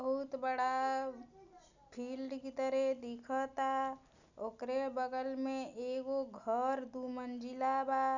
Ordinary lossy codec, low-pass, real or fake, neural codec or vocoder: none; 7.2 kHz; real; none